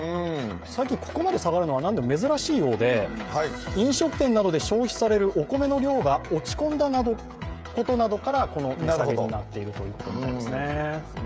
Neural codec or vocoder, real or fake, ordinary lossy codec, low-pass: codec, 16 kHz, 16 kbps, FreqCodec, smaller model; fake; none; none